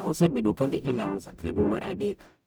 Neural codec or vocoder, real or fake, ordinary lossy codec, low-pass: codec, 44.1 kHz, 0.9 kbps, DAC; fake; none; none